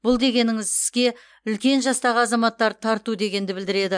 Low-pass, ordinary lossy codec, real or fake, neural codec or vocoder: 9.9 kHz; MP3, 64 kbps; real; none